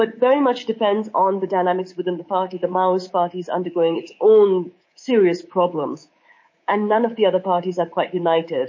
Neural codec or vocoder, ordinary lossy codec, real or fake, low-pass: codec, 24 kHz, 3.1 kbps, DualCodec; MP3, 32 kbps; fake; 7.2 kHz